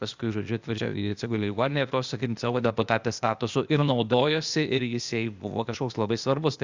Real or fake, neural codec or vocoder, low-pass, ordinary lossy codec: fake; codec, 16 kHz, 0.8 kbps, ZipCodec; 7.2 kHz; Opus, 64 kbps